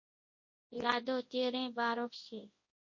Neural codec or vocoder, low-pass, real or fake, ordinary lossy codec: codec, 24 kHz, 0.9 kbps, WavTokenizer, large speech release; 7.2 kHz; fake; MP3, 32 kbps